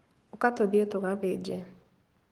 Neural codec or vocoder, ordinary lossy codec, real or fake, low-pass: codec, 44.1 kHz, 7.8 kbps, Pupu-Codec; Opus, 24 kbps; fake; 19.8 kHz